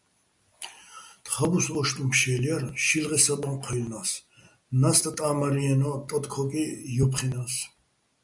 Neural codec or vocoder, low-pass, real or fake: none; 10.8 kHz; real